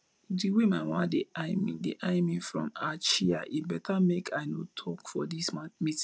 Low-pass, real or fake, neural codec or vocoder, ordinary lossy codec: none; real; none; none